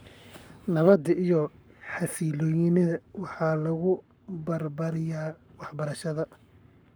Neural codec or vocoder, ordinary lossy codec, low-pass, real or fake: codec, 44.1 kHz, 7.8 kbps, Pupu-Codec; none; none; fake